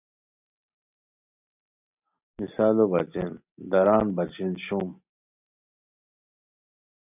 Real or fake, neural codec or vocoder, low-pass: real; none; 3.6 kHz